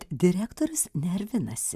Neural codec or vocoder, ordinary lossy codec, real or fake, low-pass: vocoder, 44.1 kHz, 128 mel bands every 512 samples, BigVGAN v2; Opus, 64 kbps; fake; 14.4 kHz